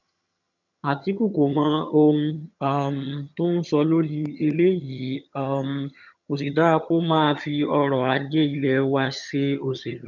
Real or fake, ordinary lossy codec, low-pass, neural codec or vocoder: fake; none; 7.2 kHz; vocoder, 22.05 kHz, 80 mel bands, HiFi-GAN